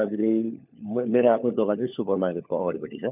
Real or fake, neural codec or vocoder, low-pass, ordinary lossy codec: fake; codec, 16 kHz, 4 kbps, FunCodec, trained on LibriTTS, 50 frames a second; 3.6 kHz; none